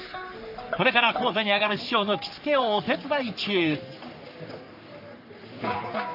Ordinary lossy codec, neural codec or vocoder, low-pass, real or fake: none; codec, 44.1 kHz, 3.4 kbps, Pupu-Codec; 5.4 kHz; fake